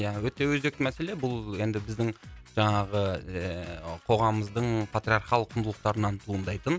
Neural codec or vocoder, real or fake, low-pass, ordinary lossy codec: none; real; none; none